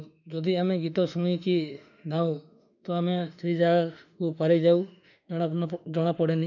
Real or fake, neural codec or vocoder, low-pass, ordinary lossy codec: fake; autoencoder, 48 kHz, 32 numbers a frame, DAC-VAE, trained on Japanese speech; 7.2 kHz; none